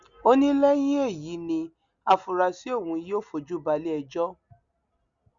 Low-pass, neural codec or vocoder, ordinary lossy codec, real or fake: 7.2 kHz; none; Opus, 64 kbps; real